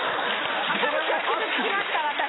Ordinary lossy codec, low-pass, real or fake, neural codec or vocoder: AAC, 16 kbps; 7.2 kHz; real; none